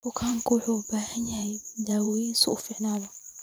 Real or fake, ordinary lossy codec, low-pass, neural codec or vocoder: fake; none; none; vocoder, 44.1 kHz, 128 mel bands every 512 samples, BigVGAN v2